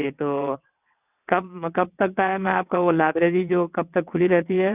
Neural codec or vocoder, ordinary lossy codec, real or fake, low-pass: vocoder, 22.05 kHz, 80 mel bands, WaveNeXt; none; fake; 3.6 kHz